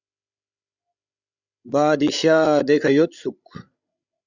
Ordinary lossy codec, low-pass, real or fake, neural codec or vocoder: Opus, 64 kbps; 7.2 kHz; fake; codec, 16 kHz, 16 kbps, FreqCodec, larger model